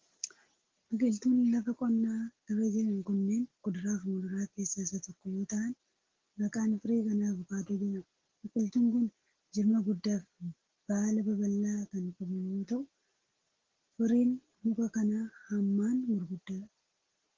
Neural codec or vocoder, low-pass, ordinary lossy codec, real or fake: none; 7.2 kHz; Opus, 16 kbps; real